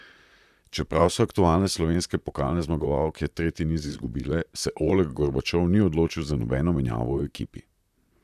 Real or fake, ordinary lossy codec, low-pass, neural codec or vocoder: fake; none; 14.4 kHz; vocoder, 44.1 kHz, 128 mel bands, Pupu-Vocoder